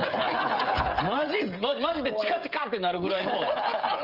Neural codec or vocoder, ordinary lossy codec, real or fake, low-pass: codec, 16 kHz, 16 kbps, FreqCodec, smaller model; Opus, 32 kbps; fake; 5.4 kHz